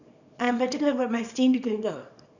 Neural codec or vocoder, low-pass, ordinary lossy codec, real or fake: codec, 24 kHz, 0.9 kbps, WavTokenizer, small release; 7.2 kHz; none; fake